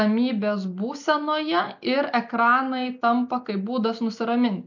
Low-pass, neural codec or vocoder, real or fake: 7.2 kHz; none; real